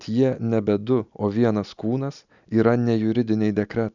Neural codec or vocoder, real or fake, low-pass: none; real; 7.2 kHz